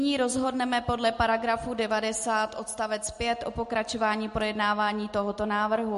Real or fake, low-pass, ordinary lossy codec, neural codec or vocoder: real; 14.4 kHz; MP3, 48 kbps; none